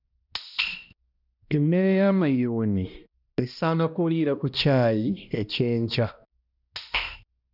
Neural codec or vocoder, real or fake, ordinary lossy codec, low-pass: codec, 16 kHz, 1 kbps, X-Codec, HuBERT features, trained on balanced general audio; fake; none; 5.4 kHz